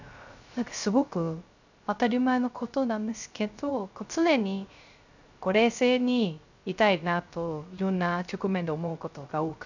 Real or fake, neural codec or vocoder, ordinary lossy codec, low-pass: fake; codec, 16 kHz, 0.3 kbps, FocalCodec; none; 7.2 kHz